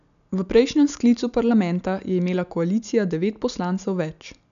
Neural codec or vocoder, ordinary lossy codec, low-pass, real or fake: none; none; 7.2 kHz; real